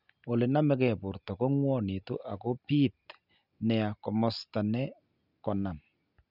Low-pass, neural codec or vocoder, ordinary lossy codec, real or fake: 5.4 kHz; none; none; real